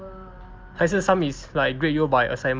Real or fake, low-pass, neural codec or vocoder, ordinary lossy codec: real; 7.2 kHz; none; Opus, 24 kbps